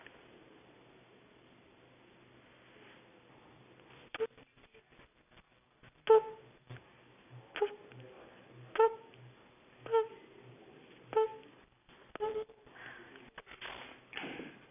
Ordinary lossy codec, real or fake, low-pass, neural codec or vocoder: none; real; 3.6 kHz; none